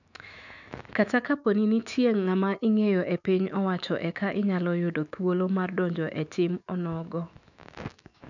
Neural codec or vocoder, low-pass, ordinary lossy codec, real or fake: autoencoder, 48 kHz, 128 numbers a frame, DAC-VAE, trained on Japanese speech; 7.2 kHz; none; fake